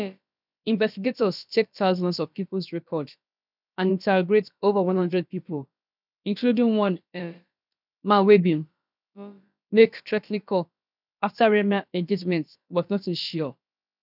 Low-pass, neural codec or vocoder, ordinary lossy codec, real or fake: 5.4 kHz; codec, 16 kHz, about 1 kbps, DyCAST, with the encoder's durations; none; fake